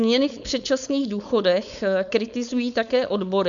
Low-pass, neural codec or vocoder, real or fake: 7.2 kHz; codec, 16 kHz, 4.8 kbps, FACodec; fake